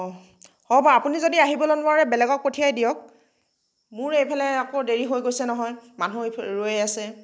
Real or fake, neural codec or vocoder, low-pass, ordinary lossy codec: real; none; none; none